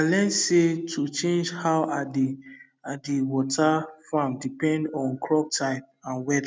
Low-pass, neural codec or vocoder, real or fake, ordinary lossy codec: none; none; real; none